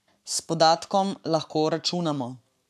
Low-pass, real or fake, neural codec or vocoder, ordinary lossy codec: 14.4 kHz; fake; codec, 44.1 kHz, 7.8 kbps, Pupu-Codec; none